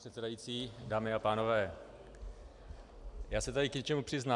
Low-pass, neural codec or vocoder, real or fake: 10.8 kHz; none; real